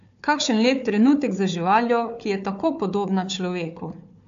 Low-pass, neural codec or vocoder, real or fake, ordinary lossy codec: 7.2 kHz; codec, 16 kHz, 4 kbps, FunCodec, trained on Chinese and English, 50 frames a second; fake; AAC, 64 kbps